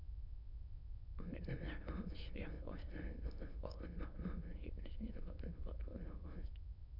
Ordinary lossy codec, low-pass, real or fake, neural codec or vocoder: none; 5.4 kHz; fake; autoencoder, 22.05 kHz, a latent of 192 numbers a frame, VITS, trained on many speakers